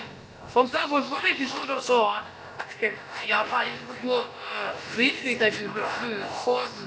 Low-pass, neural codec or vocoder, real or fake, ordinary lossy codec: none; codec, 16 kHz, about 1 kbps, DyCAST, with the encoder's durations; fake; none